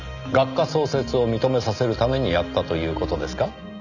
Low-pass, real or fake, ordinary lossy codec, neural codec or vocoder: 7.2 kHz; real; none; none